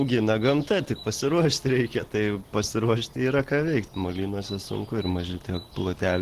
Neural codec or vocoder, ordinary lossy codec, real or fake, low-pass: none; Opus, 16 kbps; real; 14.4 kHz